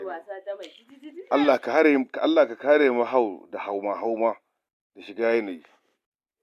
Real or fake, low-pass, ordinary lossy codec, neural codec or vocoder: real; 14.4 kHz; MP3, 96 kbps; none